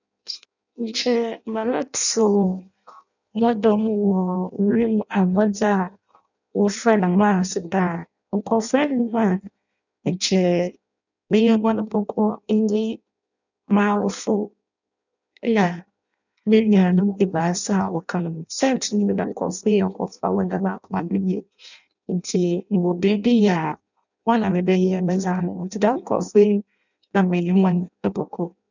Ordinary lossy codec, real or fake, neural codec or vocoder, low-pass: none; fake; codec, 16 kHz in and 24 kHz out, 0.6 kbps, FireRedTTS-2 codec; 7.2 kHz